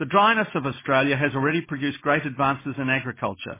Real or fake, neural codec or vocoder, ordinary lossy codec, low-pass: real; none; MP3, 16 kbps; 3.6 kHz